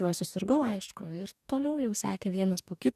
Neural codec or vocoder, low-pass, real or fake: codec, 44.1 kHz, 2.6 kbps, DAC; 14.4 kHz; fake